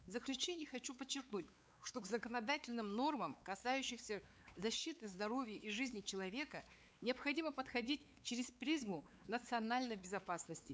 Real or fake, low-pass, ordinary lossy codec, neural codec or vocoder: fake; none; none; codec, 16 kHz, 4 kbps, X-Codec, WavLM features, trained on Multilingual LibriSpeech